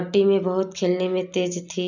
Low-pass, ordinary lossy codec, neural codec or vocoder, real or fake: 7.2 kHz; none; none; real